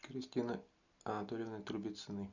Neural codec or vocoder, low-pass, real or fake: none; 7.2 kHz; real